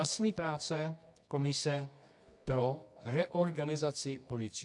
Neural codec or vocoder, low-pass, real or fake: codec, 24 kHz, 0.9 kbps, WavTokenizer, medium music audio release; 10.8 kHz; fake